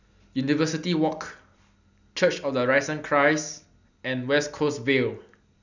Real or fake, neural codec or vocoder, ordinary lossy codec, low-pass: real; none; none; 7.2 kHz